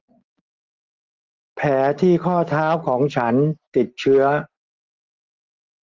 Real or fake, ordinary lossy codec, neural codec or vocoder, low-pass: real; Opus, 16 kbps; none; 7.2 kHz